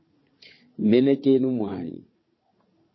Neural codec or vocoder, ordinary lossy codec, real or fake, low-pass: codec, 16 kHz, 4 kbps, FunCodec, trained on Chinese and English, 50 frames a second; MP3, 24 kbps; fake; 7.2 kHz